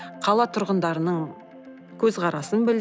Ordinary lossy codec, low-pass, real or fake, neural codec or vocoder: none; none; real; none